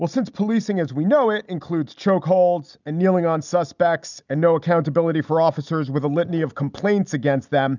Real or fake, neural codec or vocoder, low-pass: real; none; 7.2 kHz